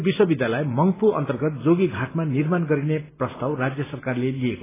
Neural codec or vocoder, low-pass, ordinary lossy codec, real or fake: none; 3.6 kHz; AAC, 16 kbps; real